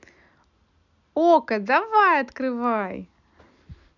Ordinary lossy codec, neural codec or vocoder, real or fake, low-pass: none; none; real; 7.2 kHz